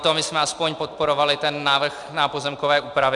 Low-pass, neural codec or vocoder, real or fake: 10.8 kHz; none; real